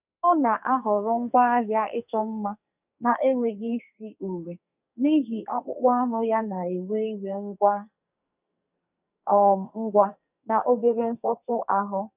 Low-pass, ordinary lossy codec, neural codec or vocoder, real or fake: 3.6 kHz; none; codec, 44.1 kHz, 2.6 kbps, SNAC; fake